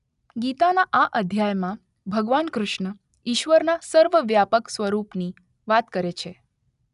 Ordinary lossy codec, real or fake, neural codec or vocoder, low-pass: none; real; none; 10.8 kHz